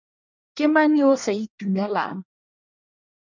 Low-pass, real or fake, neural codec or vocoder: 7.2 kHz; fake; codec, 24 kHz, 1 kbps, SNAC